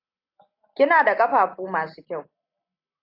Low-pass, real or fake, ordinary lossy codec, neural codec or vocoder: 5.4 kHz; real; AAC, 32 kbps; none